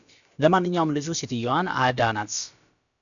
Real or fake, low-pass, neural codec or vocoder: fake; 7.2 kHz; codec, 16 kHz, about 1 kbps, DyCAST, with the encoder's durations